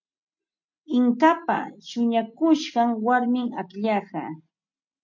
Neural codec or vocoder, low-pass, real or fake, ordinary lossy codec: none; 7.2 kHz; real; MP3, 48 kbps